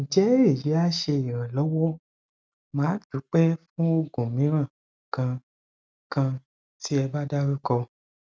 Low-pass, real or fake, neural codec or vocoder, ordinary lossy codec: none; real; none; none